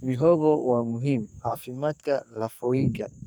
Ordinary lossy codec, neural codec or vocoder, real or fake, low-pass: none; codec, 44.1 kHz, 2.6 kbps, SNAC; fake; none